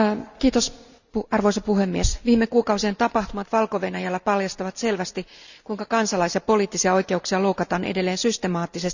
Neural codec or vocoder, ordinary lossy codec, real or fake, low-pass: none; none; real; 7.2 kHz